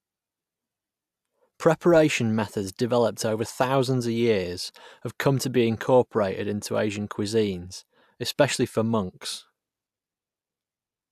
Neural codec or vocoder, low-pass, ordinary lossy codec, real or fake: none; 14.4 kHz; AAC, 96 kbps; real